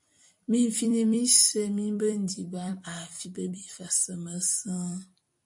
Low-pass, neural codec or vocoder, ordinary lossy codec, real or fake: 10.8 kHz; vocoder, 44.1 kHz, 128 mel bands every 256 samples, BigVGAN v2; MP3, 48 kbps; fake